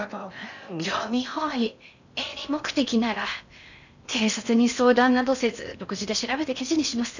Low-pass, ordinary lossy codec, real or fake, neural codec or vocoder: 7.2 kHz; none; fake; codec, 16 kHz in and 24 kHz out, 0.6 kbps, FocalCodec, streaming, 4096 codes